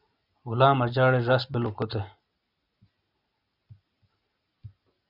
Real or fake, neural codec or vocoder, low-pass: real; none; 5.4 kHz